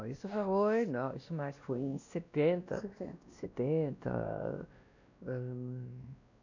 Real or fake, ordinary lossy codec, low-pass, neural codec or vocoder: fake; none; 7.2 kHz; codec, 16 kHz, 1 kbps, X-Codec, WavLM features, trained on Multilingual LibriSpeech